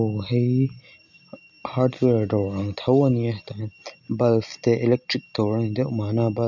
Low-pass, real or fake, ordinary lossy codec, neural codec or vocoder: 7.2 kHz; real; none; none